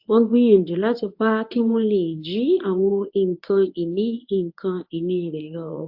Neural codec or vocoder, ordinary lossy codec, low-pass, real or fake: codec, 24 kHz, 0.9 kbps, WavTokenizer, medium speech release version 1; AAC, 48 kbps; 5.4 kHz; fake